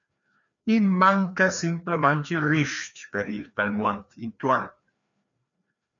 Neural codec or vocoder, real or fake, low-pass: codec, 16 kHz, 2 kbps, FreqCodec, larger model; fake; 7.2 kHz